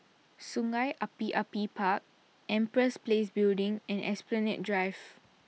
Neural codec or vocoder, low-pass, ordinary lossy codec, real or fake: none; none; none; real